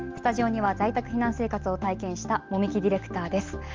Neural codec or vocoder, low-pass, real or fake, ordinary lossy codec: none; 7.2 kHz; real; Opus, 24 kbps